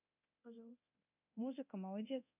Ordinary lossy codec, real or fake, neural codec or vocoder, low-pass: none; fake; codec, 24 kHz, 0.9 kbps, DualCodec; 3.6 kHz